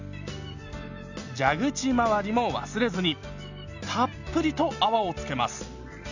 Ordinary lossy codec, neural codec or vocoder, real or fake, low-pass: none; none; real; 7.2 kHz